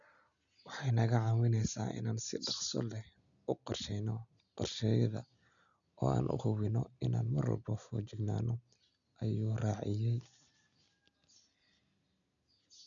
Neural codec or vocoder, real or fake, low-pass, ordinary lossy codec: none; real; 7.2 kHz; none